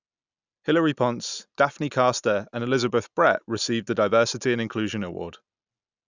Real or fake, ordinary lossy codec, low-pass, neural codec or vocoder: real; none; 7.2 kHz; none